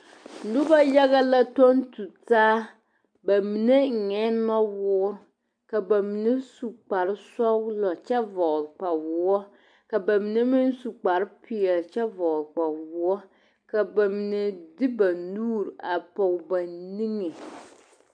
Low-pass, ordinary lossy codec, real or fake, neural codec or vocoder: 9.9 kHz; MP3, 64 kbps; real; none